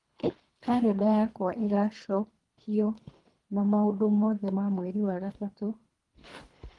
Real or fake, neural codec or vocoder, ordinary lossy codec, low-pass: fake; codec, 24 kHz, 3 kbps, HILCodec; Opus, 24 kbps; 10.8 kHz